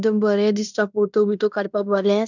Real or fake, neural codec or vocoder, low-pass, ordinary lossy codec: fake; codec, 16 kHz in and 24 kHz out, 0.9 kbps, LongCat-Audio-Codec, fine tuned four codebook decoder; 7.2 kHz; none